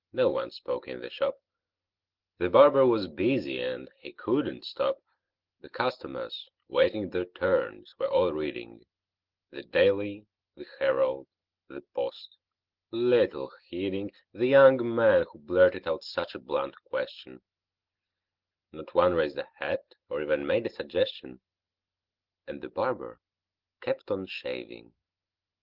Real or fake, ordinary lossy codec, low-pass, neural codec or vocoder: real; Opus, 16 kbps; 5.4 kHz; none